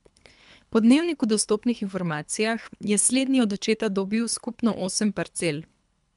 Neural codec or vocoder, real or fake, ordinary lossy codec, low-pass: codec, 24 kHz, 3 kbps, HILCodec; fake; MP3, 96 kbps; 10.8 kHz